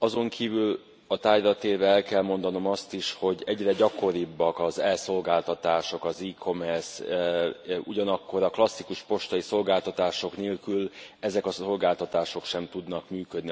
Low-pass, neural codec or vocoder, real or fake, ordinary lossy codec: none; none; real; none